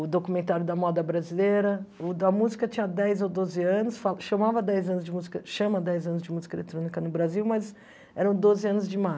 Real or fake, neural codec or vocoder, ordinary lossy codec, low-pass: real; none; none; none